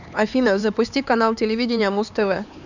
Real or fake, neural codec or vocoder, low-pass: fake; codec, 16 kHz, 4 kbps, X-Codec, HuBERT features, trained on LibriSpeech; 7.2 kHz